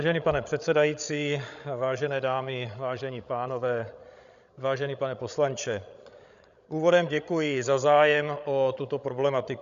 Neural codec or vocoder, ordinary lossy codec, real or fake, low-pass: codec, 16 kHz, 16 kbps, FreqCodec, larger model; MP3, 96 kbps; fake; 7.2 kHz